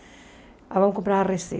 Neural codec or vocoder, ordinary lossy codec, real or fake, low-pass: none; none; real; none